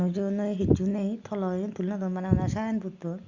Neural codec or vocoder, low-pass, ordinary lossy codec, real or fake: none; 7.2 kHz; Opus, 64 kbps; real